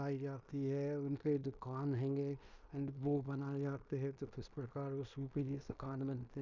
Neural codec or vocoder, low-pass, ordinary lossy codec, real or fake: codec, 16 kHz in and 24 kHz out, 0.9 kbps, LongCat-Audio-Codec, fine tuned four codebook decoder; 7.2 kHz; none; fake